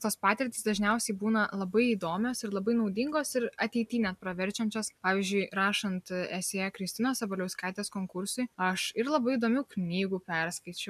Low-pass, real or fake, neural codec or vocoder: 14.4 kHz; real; none